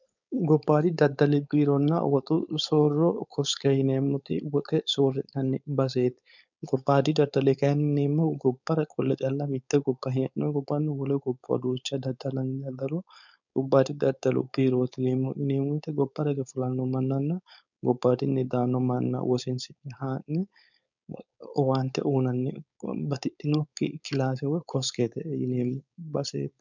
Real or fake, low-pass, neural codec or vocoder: fake; 7.2 kHz; codec, 16 kHz, 4.8 kbps, FACodec